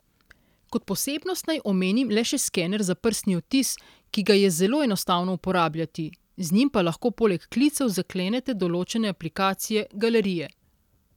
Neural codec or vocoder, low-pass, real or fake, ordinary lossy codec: none; 19.8 kHz; real; none